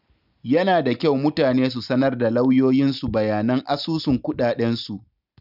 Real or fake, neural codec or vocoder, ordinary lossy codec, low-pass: real; none; none; 5.4 kHz